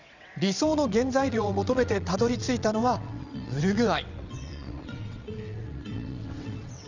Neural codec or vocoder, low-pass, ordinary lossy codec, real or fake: vocoder, 22.05 kHz, 80 mel bands, WaveNeXt; 7.2 kHz; none; fake